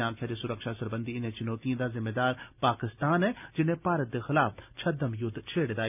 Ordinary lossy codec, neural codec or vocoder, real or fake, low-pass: none; none; real; 3.6 kHz